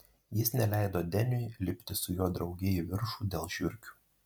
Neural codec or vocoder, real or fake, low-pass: none; real; 19.8 kHz